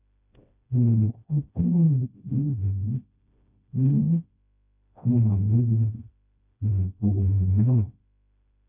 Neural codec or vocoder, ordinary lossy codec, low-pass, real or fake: codec, 16 kHz, 1 kbps, FreqCodec, smaller model; none; 3.6 kHz; fake